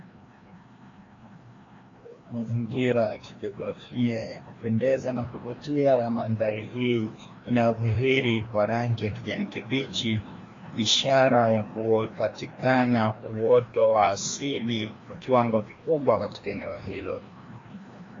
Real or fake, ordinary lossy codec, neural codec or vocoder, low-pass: fake; AAC, 32 kbps; codec, 16 kHz, 1 kbps, FreqCodec, larger model; 7.2 kHz